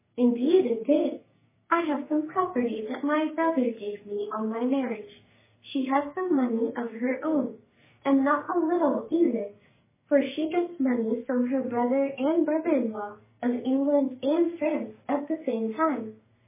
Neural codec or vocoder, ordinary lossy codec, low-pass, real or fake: codec, 44.1 kHz, 2.6 kbps, SNAC; MP3, 16 kbps; 3.6 kHz; fake